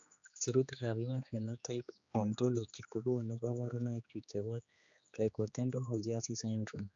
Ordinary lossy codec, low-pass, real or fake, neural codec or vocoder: AAC, 64 kbps; 7.2 kHz; fake; codec, 16 kHz, 2 kbps, X-Codec, HuBERT features, trained on general audio